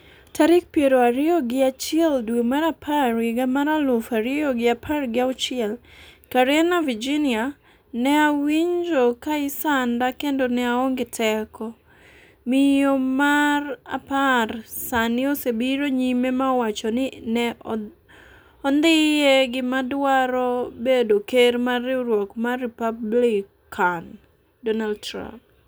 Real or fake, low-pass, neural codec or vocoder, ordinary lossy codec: real; none; none; none